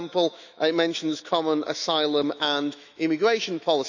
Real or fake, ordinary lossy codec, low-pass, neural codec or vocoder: fake; none; 7.2 kHz; autoencoder, 48 kHz, 128 numbers a frame, DAC-VAE, trained on Japanese speech